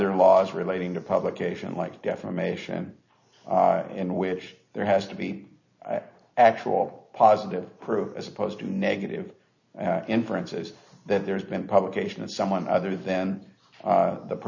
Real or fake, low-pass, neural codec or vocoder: real; 7.2 kHz; none